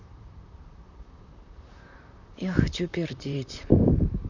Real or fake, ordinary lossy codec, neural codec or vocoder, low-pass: fake; none; vocoder, 44.1 kHz, 128 mel bands, Pupu-Vocoder; 7.2 kHz